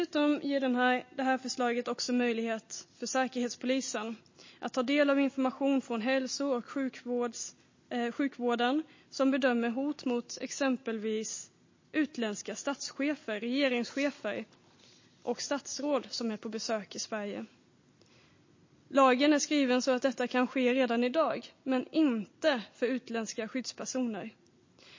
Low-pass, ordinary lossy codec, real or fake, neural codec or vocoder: 7.2 kHz; MP3, 32 kbps; real; none